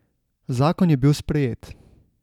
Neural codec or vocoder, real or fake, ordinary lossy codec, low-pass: none; real; none; 19.8 kHz